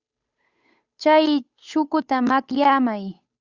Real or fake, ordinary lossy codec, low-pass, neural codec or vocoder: fake; Opus, 64 kbps; 7.2 kHz; codec, 16 kHz, 8 kbps, FunCodec, trained on Chinese and English, 25 frames a second